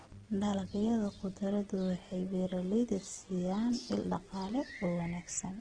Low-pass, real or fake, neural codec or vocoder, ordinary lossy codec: 9.9 kHz; fake; vocoder, 24 kHz, 100 mel bands, Vocos; Opus, 16 kbps